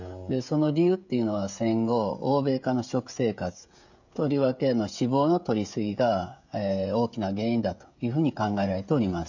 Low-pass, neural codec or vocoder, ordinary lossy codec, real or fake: 7.2 kHz; codec, 16 kHz, 16 kbps, FreqCodec, smaller model; none; fake